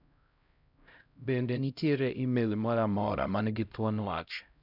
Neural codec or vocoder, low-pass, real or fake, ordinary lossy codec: codec, 16 kHz, 0.5 kbps, X-Codec, HuBERT features, trained on LibriSpeech; 5.4 kHz; fake; none